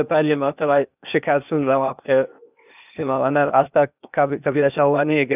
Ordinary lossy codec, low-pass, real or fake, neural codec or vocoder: none; 3.6 kHz; fake; codec, 16 kHz, 0.8 kbps, ZipCodec